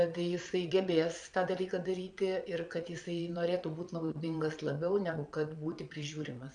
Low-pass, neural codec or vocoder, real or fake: 9.9 kHz; vocoder, 22.05 kHz, 80 mel bands, Vocos; fake